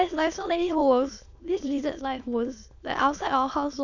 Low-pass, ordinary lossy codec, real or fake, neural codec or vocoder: 7.2 kHz; none; fake; autoencoder, 22.05 kHz, a latent of 192 numbers a frame, VITS, trained on many speakers